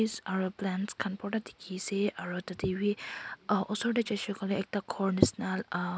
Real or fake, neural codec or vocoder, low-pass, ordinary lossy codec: real; none; none; none